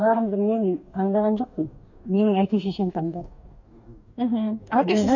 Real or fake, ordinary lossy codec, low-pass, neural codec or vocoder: fake; none; 7.2 kHz; codec, 44.1 kHz, 2.6 kbps, DAC